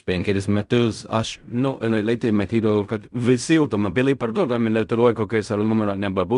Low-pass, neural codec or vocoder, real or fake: 10.8 kHz; codec, 16 kHz in and 24 kHz out, 0.4 kbps, LongCat-Audio-Codec, fine tuned four codebook decoder; fake